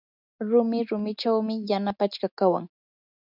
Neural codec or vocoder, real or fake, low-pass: none; real; 5.4 kHz